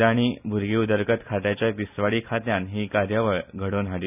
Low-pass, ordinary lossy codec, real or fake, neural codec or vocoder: 3.6 kHz; none; real; none